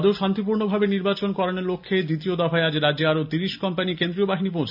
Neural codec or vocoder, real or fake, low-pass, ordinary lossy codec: none; real; 5.4 kHz; none